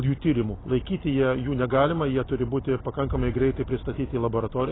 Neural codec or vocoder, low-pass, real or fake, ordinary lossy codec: none; 7.2 kHz; real; AAC, 16 kbps